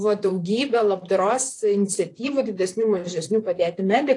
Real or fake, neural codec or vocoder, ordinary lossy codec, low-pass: fake; vocoder, 44.1 kHz, 128 mel bands, Pupu-Vocoder; AAC, 48 kbps; 10.8 kHz